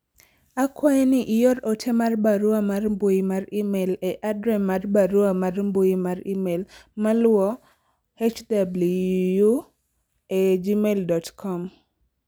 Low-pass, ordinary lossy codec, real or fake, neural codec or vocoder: none; none; real; none